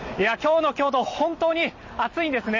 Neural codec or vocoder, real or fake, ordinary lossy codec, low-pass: none; real; MP3, 32 kbps; 7.2 kHz